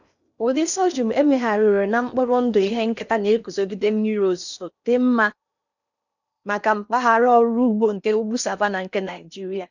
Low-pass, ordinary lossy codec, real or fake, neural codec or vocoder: 7.2 kHz; none; fake; codec, 16 kHz in and 24 kHz out, 0.8 kbps, FocalCodec, streaming, 65536 codes